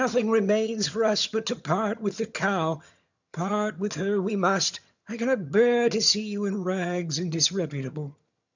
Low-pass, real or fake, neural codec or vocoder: 7.2 kHz; fake; vocoder, 22.05 kHz, 80 mel bands, HiFi-GAN